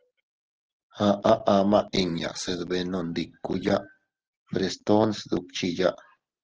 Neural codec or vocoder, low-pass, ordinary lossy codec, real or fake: none; 7.2 kHz; Opus, 16 kbps; real